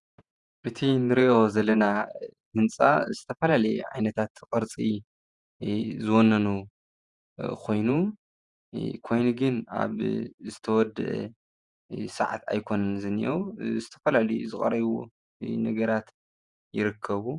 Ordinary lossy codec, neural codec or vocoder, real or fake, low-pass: Opus, 64 kbps; vocoder, 48 kHz, 128 mel bands, Vocos; fake; 10.8 kHz